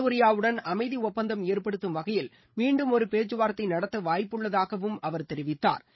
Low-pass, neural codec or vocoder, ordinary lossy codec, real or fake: 7.2 kHz; codec, 16 kHz, 16 kbps, FreqCodec, larger model; MP3, 24 kbps; fake